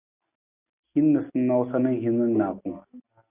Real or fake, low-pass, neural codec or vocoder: real; 3.6 kHz; none